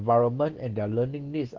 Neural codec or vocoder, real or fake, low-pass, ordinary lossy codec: none; real; 7.2 kHz; Opus, 16 kbps